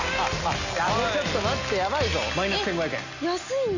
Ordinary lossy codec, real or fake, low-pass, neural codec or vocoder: none; real; 7.2 kHz; none